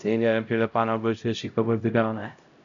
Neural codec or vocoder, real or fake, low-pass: codec, 16 kHz, 0.5 kbps, X-Codec, HuBERT features, trained on LibriSpeech; fake; 7.2 kHz